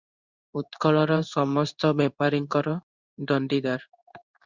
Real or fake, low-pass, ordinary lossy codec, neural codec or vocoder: fake; 7.2 kHz; Opus, 64 kbps; codec, 16 kHz in and 24 kHz out, 1 kbps, XY-Tokenizer